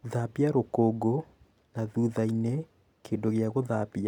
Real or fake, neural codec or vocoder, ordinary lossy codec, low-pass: real; none; none; 19.8 kHz